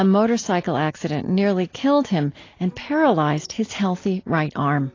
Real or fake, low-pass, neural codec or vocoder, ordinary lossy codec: real; 7.2 kHz; none; AAC, 32 kbps